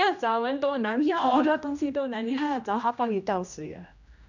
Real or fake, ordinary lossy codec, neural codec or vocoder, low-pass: fake; none; codec, 16 kHz, 1 kbps, X-Codec, HuBERT features, trained on general audio; 7.2 kHz